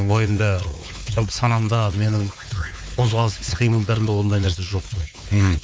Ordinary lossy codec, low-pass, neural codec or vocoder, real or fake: none; none; codec, 16 kHz, 4 kbps, X-Codec, WavLM features, trained on Multilingual LibriSpeech; fake